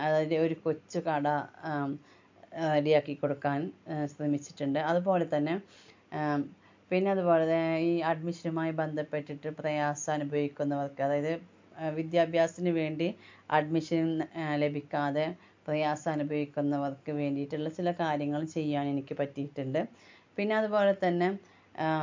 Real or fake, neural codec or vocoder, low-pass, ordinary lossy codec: real; none; 7.2 kHz; MP3, 48 kbps